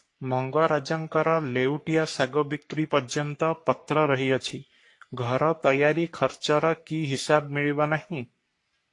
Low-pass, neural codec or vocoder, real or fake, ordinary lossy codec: 10.8 kHz; codec, 44.1 kHz, 3.4 kbps, Pupu-Codec; fake; AAC, 48 kbps